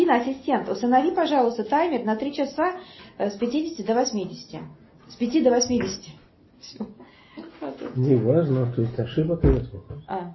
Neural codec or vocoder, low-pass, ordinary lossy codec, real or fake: none; 7.2 kHz; MP3, 24 kbps; real